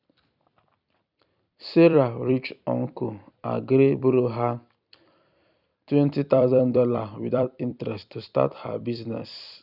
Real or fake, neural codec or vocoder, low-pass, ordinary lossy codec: fake; vocoder, 44.1 kHz, 128 mel bands every 256 samples, BigVGAN v2; 5.4 kHz; none